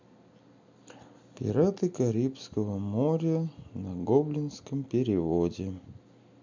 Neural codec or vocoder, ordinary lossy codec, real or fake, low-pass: none; MP3, 64 kbps; real; 7.2 kHz